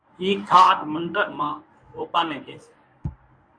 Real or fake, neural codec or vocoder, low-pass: fake; codec, 24 kHz, 0.9 kbps, WavTokenizer, medium speech release version 1; 9.9 kHz